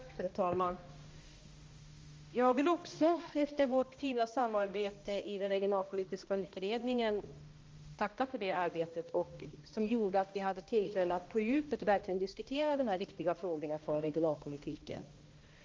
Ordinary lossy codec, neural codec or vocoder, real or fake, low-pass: Opus, 32 kbps; codec, 16 kHz, 1 kbps, X-Codec, HuBERT features, trained on balanced general audio; fake; 7.2 kHz